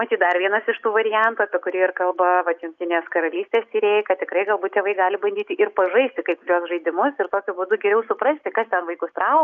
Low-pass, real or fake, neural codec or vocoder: 7.2 kHz; real; none